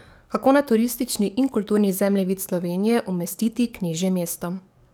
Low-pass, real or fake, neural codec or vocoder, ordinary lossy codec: none; fake; codec, 44.1 kHz, 7.8 kbps, DAC; none